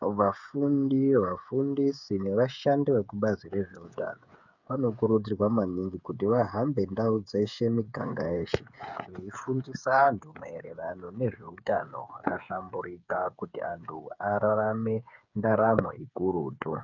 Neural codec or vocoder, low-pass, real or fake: codec, 16 kHz, 8 kbps, FreqCodec, smaller model; 7.2 kHz; fake